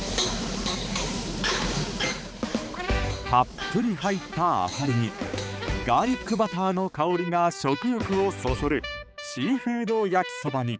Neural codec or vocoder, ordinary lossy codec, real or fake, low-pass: codec, 16 kHz, 4 kbps, X-Codec, HuBERT features, trained on balanced general audio; none; fake; none